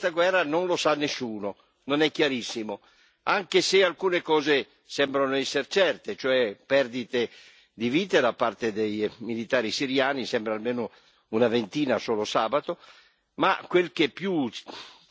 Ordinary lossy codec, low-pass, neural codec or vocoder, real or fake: none; none; none; real